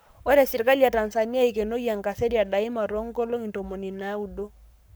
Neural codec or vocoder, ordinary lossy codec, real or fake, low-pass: codec, 44.1 kHz, 7.8 kbps, Pupu-Codec; none; fake; none